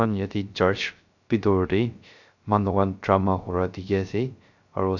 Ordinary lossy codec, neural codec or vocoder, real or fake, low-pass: none; codec, 16 kHz, 0.3 kbps, FocalCodec; fake; 7.2 kHz